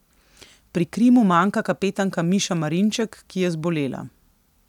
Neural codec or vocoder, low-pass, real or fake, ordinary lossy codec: none; 19.8 kHz; real; none